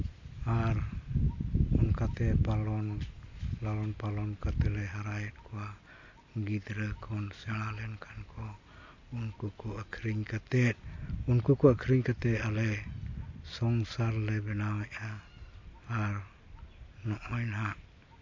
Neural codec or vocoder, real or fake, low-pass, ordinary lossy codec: none; real; 7.2 kHz; MP3, 48 kbps